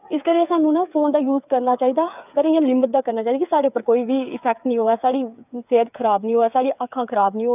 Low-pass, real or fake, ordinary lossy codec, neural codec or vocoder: 3.6 kHz; fake; none; codec, 16 kHz, 8 kbps, FreqCodec, smaller model